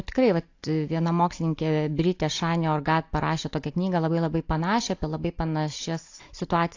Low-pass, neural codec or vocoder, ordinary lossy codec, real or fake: 7.2 kHz; none; AAC, 48 kbps; real